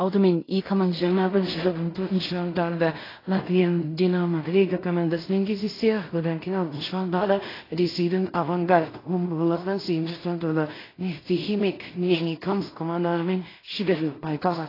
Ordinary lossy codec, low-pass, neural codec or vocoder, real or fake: AAC, 24 kbps; 5.4 kHz; codec, 16 kHz in and 24 kHz out, 0.4 kbps, LongCat-Audio-Codec, two codebook decoder; fake